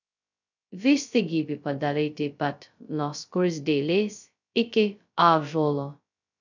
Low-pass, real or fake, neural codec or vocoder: 7.2 kHz; fake; codec, 16 kHz, 0.2 kbps, FocalCodec